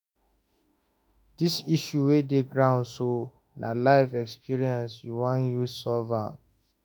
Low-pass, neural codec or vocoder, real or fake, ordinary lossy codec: none; autoencoder, 48 kHz, 32 numbers a frame, DAC-VAE, trained on Japanese speech; fake; none